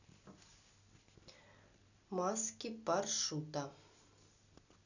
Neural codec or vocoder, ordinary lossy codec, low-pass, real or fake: none; none; 7.2 kHz; real